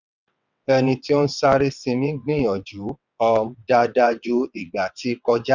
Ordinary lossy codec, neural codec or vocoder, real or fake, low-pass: none; vocoder, 22.05 kHz, 80 mel bands, WaveNeXt; fake; 7.2 kHz